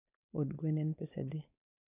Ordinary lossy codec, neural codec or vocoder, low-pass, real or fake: none; codec, 16 kHz, 4.8 kbps, FACodec; 3.6 kHz; fake